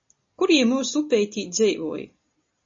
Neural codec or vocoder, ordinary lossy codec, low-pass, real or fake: none; MP3, 32 kbps; 7.2 kHz; real